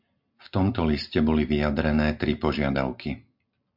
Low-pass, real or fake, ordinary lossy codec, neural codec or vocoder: 5.4 kHz; real; MP3, 48 kbps; none